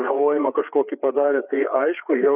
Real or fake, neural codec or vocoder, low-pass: fake; codec, 16 kHz, 4 kbps, FreqCodec, larger model; 3.6 kHz